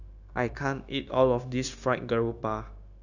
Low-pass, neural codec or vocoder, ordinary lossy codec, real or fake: 7.2 kHz; codec, 16 kHz, 0.9 kbps, LongCat-Audio-Codec; none; fake